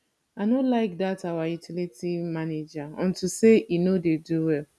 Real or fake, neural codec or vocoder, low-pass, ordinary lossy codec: real; none; none; none